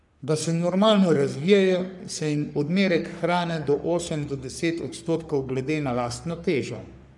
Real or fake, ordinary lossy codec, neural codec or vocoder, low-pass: fake; none; codec, 44.1 kHz, 3.4 kbps, Pupu-Codec; 10.8 kHz